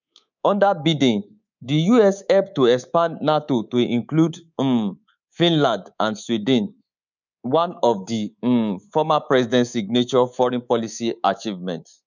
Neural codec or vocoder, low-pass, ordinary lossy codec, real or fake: codec, 24 kHz, 3.1 kbps, DualCodec; 7.2 kHz; none; fake